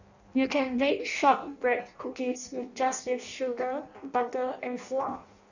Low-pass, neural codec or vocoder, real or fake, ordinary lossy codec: 7.2 kHz; codec, 16 kHz in and 24 kHz out, 0.6 kbps, FireRedTTS-2 codec; fake; none